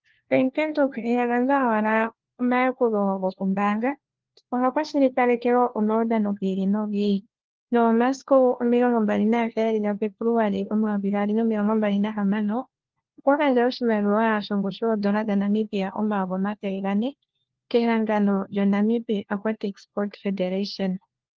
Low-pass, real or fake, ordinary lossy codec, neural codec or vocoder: 7.2 kHz; fake; Opus, 16 kbps; codec, 16 kHz, 1 kbps, FunCodec, trained on LibriTTS, 50 frames a second